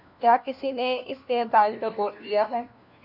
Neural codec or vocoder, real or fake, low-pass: codec, 16 kHz, 1 kbps, FunCodec, trained on LibriTTS, 50 frames a second; fake; 5.4 kHz